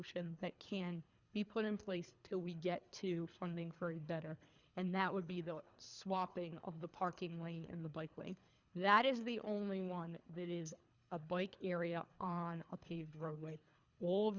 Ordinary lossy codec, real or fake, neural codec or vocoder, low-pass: Opus, 64 kbps; fake; codec, 24 kHz, 3 kbps, HILCodec; 7.2 kHz